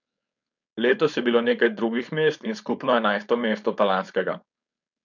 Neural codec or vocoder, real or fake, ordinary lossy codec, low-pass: codec, 16 kHz, 4.8 kbps, FACodec; fake; none; 7.2 kHz